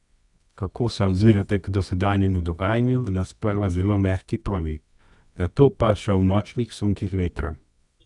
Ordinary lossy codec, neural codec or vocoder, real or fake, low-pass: none; codec, 24 kHz, 0.9 kbps, WavTokenizer, medium music audio release; fake; 10.8 kHz